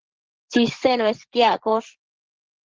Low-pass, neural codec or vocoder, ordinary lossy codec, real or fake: 7.2 kHz; vocoder, 24 kHz, 100 mel bands, Vocos; Opus, 16 kbps; fake